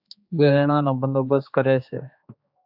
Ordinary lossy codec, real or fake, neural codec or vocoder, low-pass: AAC, 48 kbps; fake; codec, 16 kHz, 2 kbps, X-Codec, HuBERT features, trained on general audio; 5.4 kHz